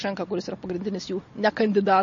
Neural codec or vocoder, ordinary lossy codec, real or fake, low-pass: none; MP3, 32 kbps; real; 7.2 kHz